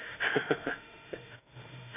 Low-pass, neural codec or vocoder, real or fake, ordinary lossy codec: 3.6 kHz; autoencoder, 48 kHz, 32 numbers a frame, DAC-VAE, trained on Japanese speech; fake; none